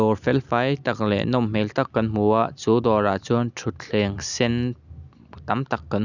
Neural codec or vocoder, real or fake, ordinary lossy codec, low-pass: none; real; none; 7.2 kHz